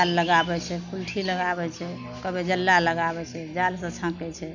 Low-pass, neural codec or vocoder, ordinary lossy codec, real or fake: 7.2 kHz; none; none; real